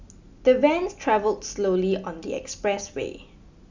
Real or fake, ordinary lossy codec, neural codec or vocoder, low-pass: real; Opus, 64 kbps; none; 7.2 kHz